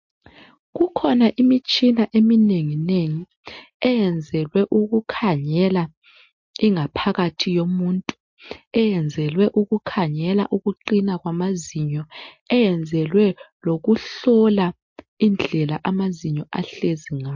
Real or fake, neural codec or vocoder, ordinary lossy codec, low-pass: real; none; MP3, 48 kbps; 7.2 kHz